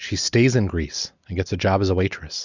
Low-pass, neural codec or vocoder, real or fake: 7.2 kHz; none; real